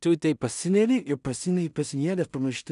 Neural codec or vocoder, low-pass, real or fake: codec, 16 kHz in and 24 kHz out, 0.4 kbps, LongCat-Audio-Codec, two codebook decoder; 10.8 kHz; fake